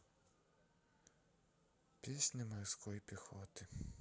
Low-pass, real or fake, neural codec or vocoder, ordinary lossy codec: none; real; none; none